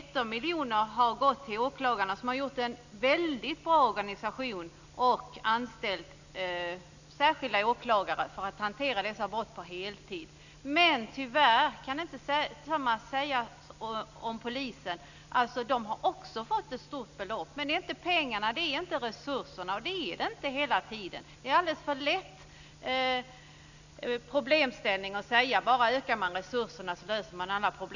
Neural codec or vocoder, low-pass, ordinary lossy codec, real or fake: none; 7.2 kHz; none; real